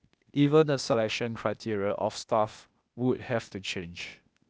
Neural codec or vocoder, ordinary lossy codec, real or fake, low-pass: codec, 16 kHz, 0.8 kbps, ZipCodec; none; fake; none